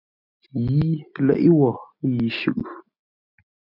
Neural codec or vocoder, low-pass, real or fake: none; 5.4 kHz; real